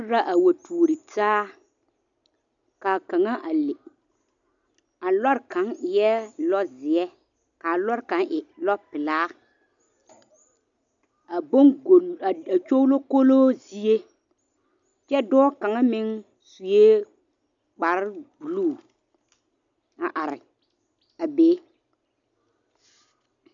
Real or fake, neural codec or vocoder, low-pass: real; none; 7.2 kHz